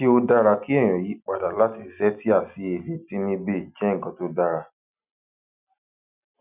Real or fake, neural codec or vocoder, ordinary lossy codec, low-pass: real; none; none; 3.6 kHz